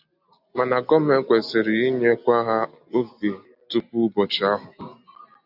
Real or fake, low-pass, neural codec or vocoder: real; 5.4 kHz; none